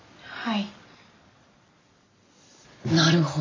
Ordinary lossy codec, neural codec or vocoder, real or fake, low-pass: AAC, 32 kbps; none; real; 7.2 kHz